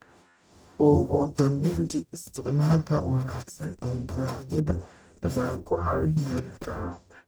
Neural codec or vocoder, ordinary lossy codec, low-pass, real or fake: codec, 44.1 kHz, 0.9 kbps, DAC; none; none; fake